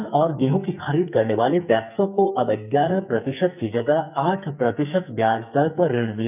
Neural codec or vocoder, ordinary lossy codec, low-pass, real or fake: codec, 44.1 kHz, 2.6 kbps, SNAC; none; 3.6 kHz; fake